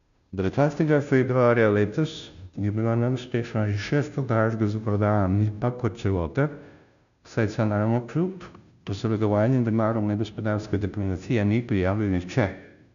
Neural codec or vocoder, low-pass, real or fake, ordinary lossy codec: codec, 16 kHz, 0.5 kbps, FunCodec, trained on Chinese and English, 25 frames a second; 7.2 kHz; fake; none